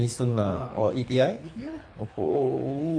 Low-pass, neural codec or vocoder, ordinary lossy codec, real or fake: 9.9 kHz; codec, 24 kHz, 3 kbps, HILCodec; AAC, 48 kbps; fake